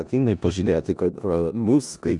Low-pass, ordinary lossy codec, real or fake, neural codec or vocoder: 10.8 kHz; MP3, 96 kbps; fake; codec, 16 kHz in and 24 kHz out, 0.4 kbps, LongCat-Audio-Codec, four codebook decoder